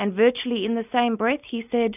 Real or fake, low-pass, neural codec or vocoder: real; 3.6 kHz; none